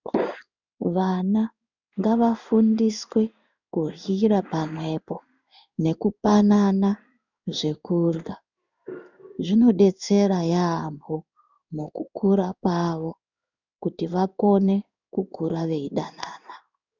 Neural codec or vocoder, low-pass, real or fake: codec, 16 kHz in and 24 kHz out, 1 kbps, XY-Tokenizer; 7.2 kHz; fake